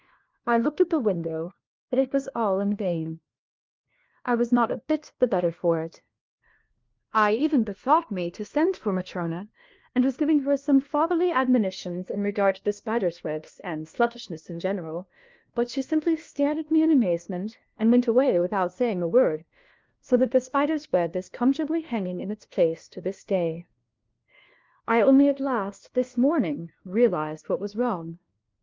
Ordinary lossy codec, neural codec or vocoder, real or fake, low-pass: Opus, 32 kbps; codec, 16 kHz, 1 kbps, FunCodec, trained on LibriTTS, 50 frames a second; fake; 7.2 kHz